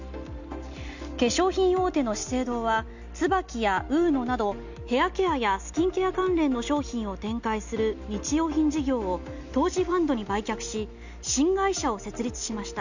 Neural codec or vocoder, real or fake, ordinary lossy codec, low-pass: none; real; none; 7.2 kHz